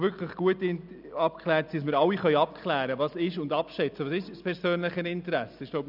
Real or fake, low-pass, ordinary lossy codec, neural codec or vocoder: real; 5.4 kHz; none; none